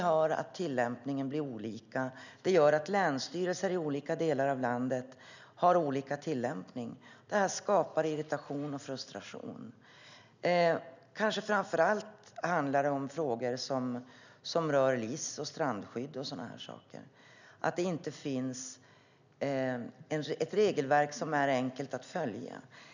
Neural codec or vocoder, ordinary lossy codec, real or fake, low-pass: none; none; real; 7.2 kHz